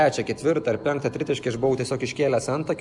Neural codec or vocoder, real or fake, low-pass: none; real; 10.8 kHz